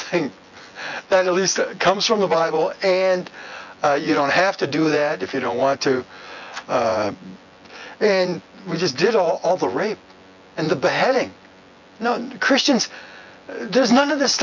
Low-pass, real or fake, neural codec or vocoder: 7.2 kHz; fake; vocoder, 24 kHz, 100 mel bands, Vocos